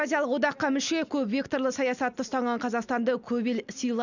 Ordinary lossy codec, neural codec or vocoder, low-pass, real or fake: none; none; 7.2 kHz; real